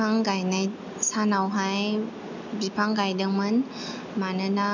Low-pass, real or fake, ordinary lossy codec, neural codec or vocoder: 7.2 kHz; real; none; none